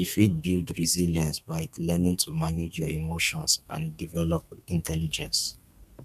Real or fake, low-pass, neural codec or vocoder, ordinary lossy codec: fake; 14.4 kHz; codec, 32 kHz, 1.9 kbps, SNAC; none